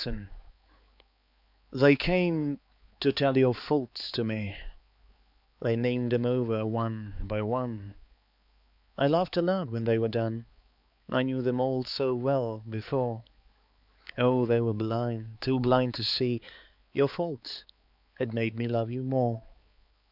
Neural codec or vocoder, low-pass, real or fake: codec, 16 kHz, 4 kbps, X-Codec, HuBERT features, trained on balanced general audio; 5.4 kHz; fake